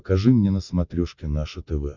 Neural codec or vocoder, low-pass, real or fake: none; 7.2 kHz; real